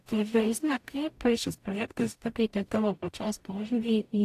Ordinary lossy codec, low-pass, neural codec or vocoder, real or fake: AAC, 64 kbps; 14.4 kHz; codec, 44.1 kHz, 0.9 kbps, DAC; fake